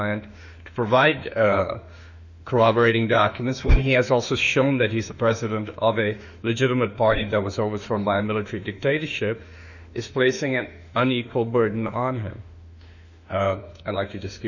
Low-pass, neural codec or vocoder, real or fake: 7.2 kHz; autoencoder, 48 kHz, 32 numbers a frame, DAC-VAE, trained on Japanese speech; fake